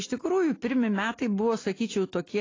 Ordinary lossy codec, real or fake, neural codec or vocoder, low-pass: AAC, 32 kbps; real; none; 7.2 kHz